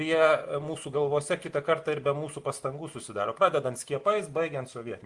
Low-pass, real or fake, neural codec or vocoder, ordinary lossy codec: 10.8 kHz; real; none; Opus, 24 kbps